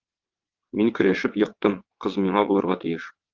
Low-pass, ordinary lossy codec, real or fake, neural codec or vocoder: 7.2 kHz; Opus, 32 kbps; fake; vocoder, 22.05 kHz, 80 mel bands, WaveNeXt